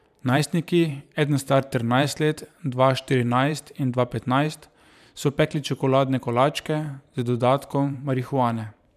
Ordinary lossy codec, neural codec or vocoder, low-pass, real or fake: none; vocoder, 44.1 kHz, 128 mel bands every 512 samples, BigVGAN v2; 14.4 kHz; fake